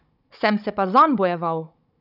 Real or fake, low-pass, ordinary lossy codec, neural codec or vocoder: fake; 5.4 kHz; none; codec, 16 kHz, 16 kbps, FunCodec, trained on Chinese and English, 50 frames a second